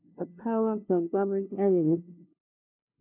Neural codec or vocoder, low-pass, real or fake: codec, 16 kHz, 0.5 kbps, FunCodec, trained on LibriTTS, 25 frames a second; 3.6 kHz; fake